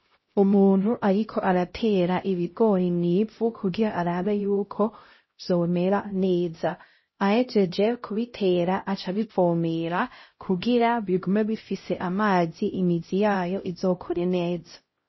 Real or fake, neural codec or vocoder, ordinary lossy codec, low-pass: fake; codec, 16 kHz, 0.5 kbps, X-Codec, HuBERT features, trained on LibriSpeech; MP3, 24 kbps; 7.2 kHz